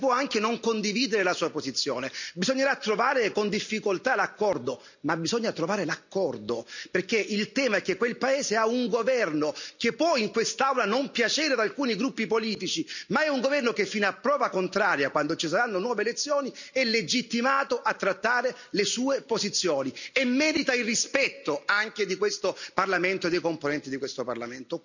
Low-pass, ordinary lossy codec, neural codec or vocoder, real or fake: 7.2 kHz; none; none; real